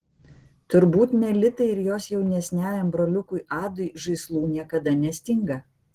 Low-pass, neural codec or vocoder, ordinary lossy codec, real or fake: 14.4 kHz; none; Opus, 16 kbps; real